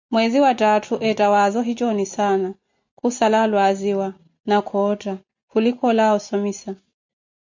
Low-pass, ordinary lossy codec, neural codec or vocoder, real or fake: 7.2 kHz; MP3, 48 kbps; none; real